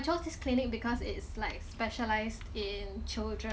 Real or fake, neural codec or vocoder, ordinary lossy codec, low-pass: real; none; none; none